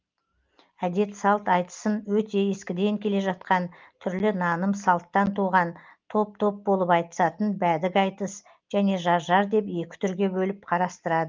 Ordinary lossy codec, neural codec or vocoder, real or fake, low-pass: Opus, 24 kbps; none; real; 7.2 kHz